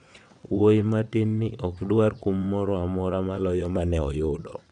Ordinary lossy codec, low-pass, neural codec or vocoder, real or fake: none; 9.9 kHz; vocoder, 22.05 kHz, 80 mel bands, WaveNeXt; fake